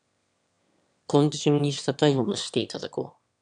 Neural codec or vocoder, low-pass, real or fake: autoencoder, 22.05 kHz, a latent of 192 numbers a frame, VITS, trained on one speaker; 9.9 kHz; fake